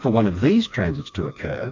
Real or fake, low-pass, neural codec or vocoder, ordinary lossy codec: fake; 7.2 kHz; codec, 16 kHz, 2 kbps, FreqCodec, smaller model; AAC, 48 kbps